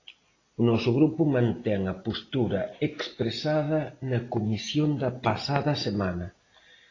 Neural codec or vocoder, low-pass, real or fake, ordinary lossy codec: none; 7.2 kHz; real; AAC, 32 kbps